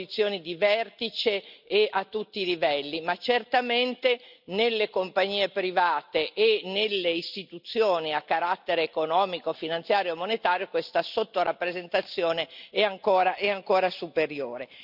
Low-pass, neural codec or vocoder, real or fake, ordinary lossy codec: 5.4 kHz; none; real; none